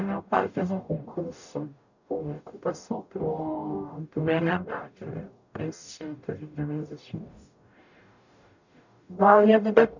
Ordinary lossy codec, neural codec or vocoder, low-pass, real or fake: none; codec, 44.1 kHz, 0.9 kbps, DAC; 7.2 kHz; fake